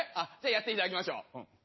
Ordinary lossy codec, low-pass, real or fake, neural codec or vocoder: MP3, 24 kbps; 7.2 kHz; real; none